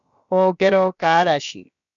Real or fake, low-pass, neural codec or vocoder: fake; 7.2 kHz; codec, 16 kHz, about 1 kbps, DyCAST, with the encoder's durations